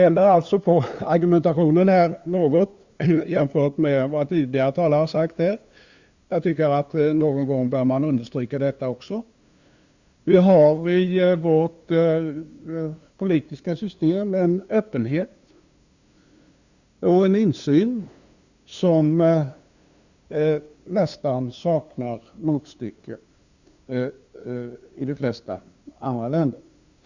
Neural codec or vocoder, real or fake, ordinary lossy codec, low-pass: codec, 16 kHz, 2 kbps, FunCodec, trained on LibriTTS, 25 frames a second; fake; Opus, 64 kbps; 7.2 kHz